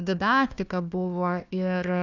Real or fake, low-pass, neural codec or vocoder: fake; 7.2 kHz; codec, 16 kHz, 1 kbps, FunCodec, trained on Chinese and English, 50 frames a second